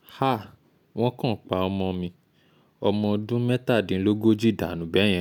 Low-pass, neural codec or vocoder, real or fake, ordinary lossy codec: 19.8 kHz; none; real; none